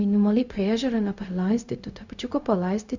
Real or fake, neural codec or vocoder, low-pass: fake; codec, 16 kHz, 0.4 kbps, LongCat-Audio-Codec; 7.2 kHz